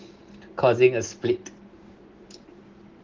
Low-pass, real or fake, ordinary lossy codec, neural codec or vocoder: 7.2 kHz; real; Opus, 16 kbps; none